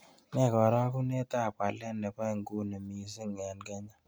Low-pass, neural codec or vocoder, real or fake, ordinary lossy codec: none; none; real; none